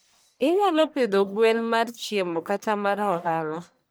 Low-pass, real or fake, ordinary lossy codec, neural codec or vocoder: none; fake; none; codec, 44.1 kHz, 1.7 kbps, Pupu-Codec